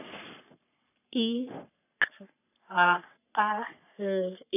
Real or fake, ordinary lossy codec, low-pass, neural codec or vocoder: fake; none; 3.6 kHz; codec, 44.1 kHz, 3.4 kbps, Pupu-Codec